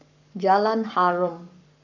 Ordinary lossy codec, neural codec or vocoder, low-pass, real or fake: none; codec, 44.1 kHz, 7.8 kbps, Pupu-Codec; 7.2 kHz; fake